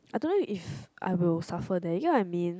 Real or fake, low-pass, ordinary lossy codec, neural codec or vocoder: real; none; none; none